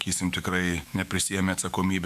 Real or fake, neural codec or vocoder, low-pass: fake; autoencoder, 48 kHz, 128 numbers a frame, DAC-VAE, trained on Japanese speech; 14.4 kHz